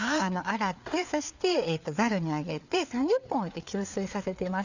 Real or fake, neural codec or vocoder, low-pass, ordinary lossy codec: fake; codec, 16 kHz, 4 kbps, FreqCodec, larger model; 7.2 kHz; none